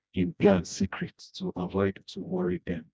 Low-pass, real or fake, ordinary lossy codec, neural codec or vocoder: none; fake; none; codec, 16 kHz, 1 kbps, FreqCodec, smaller model